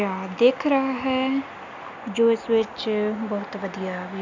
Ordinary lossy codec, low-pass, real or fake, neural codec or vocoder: none; 7.2 kHz; real; none